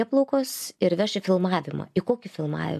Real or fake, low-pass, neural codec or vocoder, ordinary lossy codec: real; 14.4 kHz; none; AAC, 96 kbps